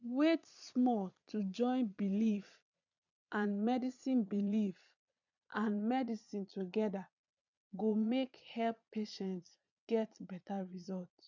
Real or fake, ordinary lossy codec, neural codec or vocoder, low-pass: fake; none; vocoder, 22.05 kHz, 80 mel bands, Vocos; 7.2 kHz